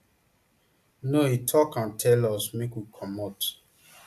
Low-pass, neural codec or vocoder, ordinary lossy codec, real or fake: 14.4 kHz; none; AAC, 96 kbps; real